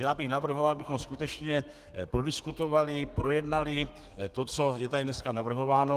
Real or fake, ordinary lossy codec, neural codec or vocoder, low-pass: fake; Opus, 24 kbps; codec, 32 kHz, 1.9 kbps, SNAC; 14.4 kHz